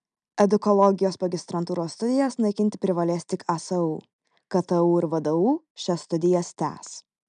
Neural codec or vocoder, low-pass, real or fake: none; 9.9 kHz; real